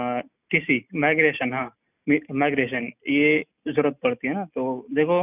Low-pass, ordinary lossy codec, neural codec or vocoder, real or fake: 3.6 kHz; none; none; real